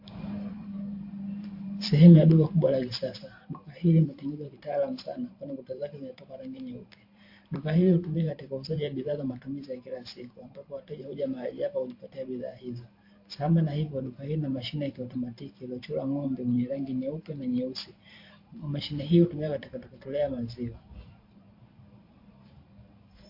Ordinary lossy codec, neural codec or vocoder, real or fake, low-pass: MP3, 32 kbps; vocoder, 44.1 kHz, 128 mel bands every 512 samples, BigVGAN v2; fake; 5.4 kHz